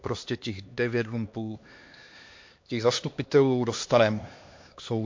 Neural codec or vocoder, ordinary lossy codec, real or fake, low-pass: codec, 16 kHz, 2 kbps, X-Codec, HuBERT features, trained on LibriSpeech; MP3, 48 kbps; fake; 7.2 kHz